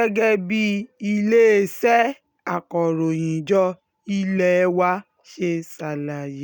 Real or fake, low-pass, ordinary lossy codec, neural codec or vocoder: real; 19.8 kHz; none; none